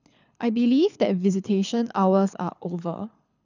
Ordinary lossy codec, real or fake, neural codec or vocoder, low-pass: none; fake; codec, 24 kHz, 6 kbps, HILCodec; 7.2 kHz